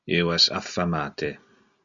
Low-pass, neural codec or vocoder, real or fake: 7.2 kHz; none; real